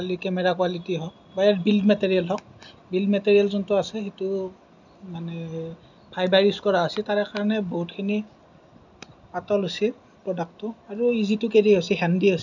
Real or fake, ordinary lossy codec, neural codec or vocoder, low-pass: real; none; none; 7.2 kHz